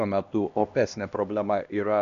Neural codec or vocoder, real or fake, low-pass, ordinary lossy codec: codec, 16 kHz, 1 kbps, X-Codec, HuBERT features, trained on LibriSpeech; fake; 7.2 kHz; MP3, 96 kbps